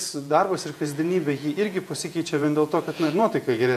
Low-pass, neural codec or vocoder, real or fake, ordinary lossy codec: 14.4 kHz; vocoder, 48 kHz, 128 mel bands, Vocos; fake; MP3, 64 kbps